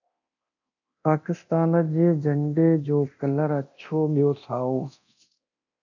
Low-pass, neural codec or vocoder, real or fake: 7.2 kHz; codec, 24 kHz, 0.9 kbps, DualCodec; fake